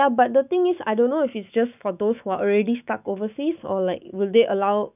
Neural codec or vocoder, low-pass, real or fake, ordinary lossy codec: autoencoder, 48 kHz, 128 numbers a frame, DAC-VAE, trained on Japanese speech; 3.6 kHz; fake; none